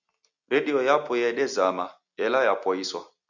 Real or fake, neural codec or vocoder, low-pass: real; none; 7.2 kHz